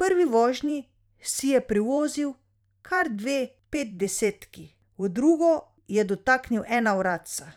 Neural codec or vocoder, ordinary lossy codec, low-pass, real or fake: vocoder, 44.1 kHz, 128 mel bands every 512 samples, BigVGAN v2; none; 19.8 kHz; fake